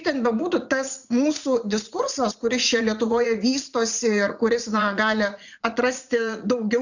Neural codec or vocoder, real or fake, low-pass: vocoder, 44.1 kHz, 80 mel bands, Vocos; fake; 7.2 kHz